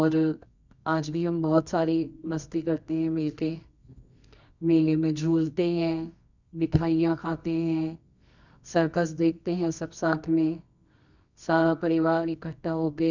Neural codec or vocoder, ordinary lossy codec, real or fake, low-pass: codec, 24 kHz, 0.9 kbps, WavTokenizer, medium music audio release; none; fake; 7.2 kHz